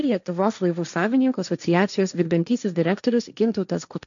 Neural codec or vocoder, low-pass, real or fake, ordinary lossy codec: codec, 16 kHz, 1.1 kbps, Voila-Tokenizer; 7.2 kHz; fake; MP3, 96 kbps